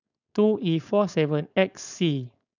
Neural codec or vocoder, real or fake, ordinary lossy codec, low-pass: codec, 16 kHz, 4.8 kbps, FACodec; fake; none; 7.2 kHz